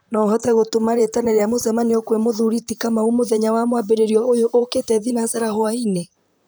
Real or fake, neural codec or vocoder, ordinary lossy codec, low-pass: fake; vocoder, 44.1 kHz, 128 mel bands, Pupu-Vocoder; none; none